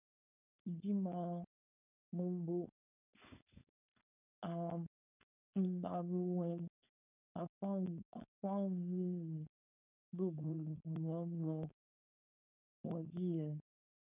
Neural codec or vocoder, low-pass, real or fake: codec, 16 kHz, 4.8 kbps, FACodec; 3.6 kHz; fake